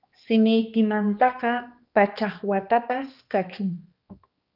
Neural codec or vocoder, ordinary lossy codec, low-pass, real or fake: codec, 16 kHz, 2 kbps, X-Codec, HuBERT features, trained on balanced general audio; Opus, 24 kbps; 5.4 kHz; fake